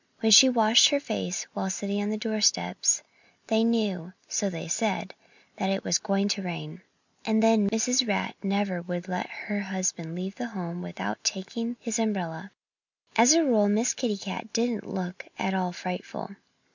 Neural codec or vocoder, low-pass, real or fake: none; 7.2 kHz; real